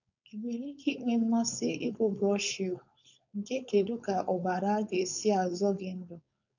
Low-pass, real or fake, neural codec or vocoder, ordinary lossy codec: 7.2 kHz; fake; codec, 16 kHz, 4.8 kbps, FACodec; none